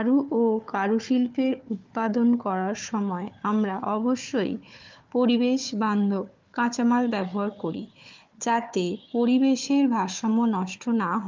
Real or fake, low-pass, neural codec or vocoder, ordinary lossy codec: fake; 7.2 kHz; codec, 16 kHz, 4 kbps, FunCodec, trained on Chinese and English, 50 frames a second; Opus, 32 kbps